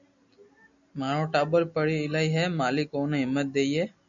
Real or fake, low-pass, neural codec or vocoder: real; 7.2 kHz; none